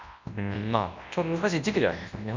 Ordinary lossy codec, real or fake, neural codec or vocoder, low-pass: MP3, 64 kbps; fake; codec, 24 kHz, 0.9 kbps, WavTokenizer, large speech release; 7.2 kHz